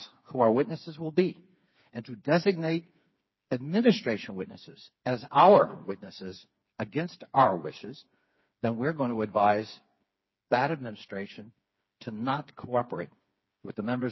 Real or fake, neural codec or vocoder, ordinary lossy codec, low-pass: fake; codec, 16 kHz, 4 kbps, FreqCodec, smaller model; MP3, 24 kbps; 7.2 kHz